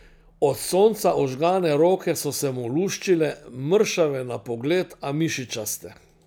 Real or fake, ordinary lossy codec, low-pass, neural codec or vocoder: real; none; none; none